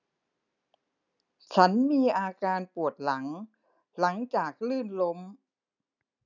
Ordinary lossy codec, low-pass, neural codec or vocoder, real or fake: none; 7.2 kHz; none; real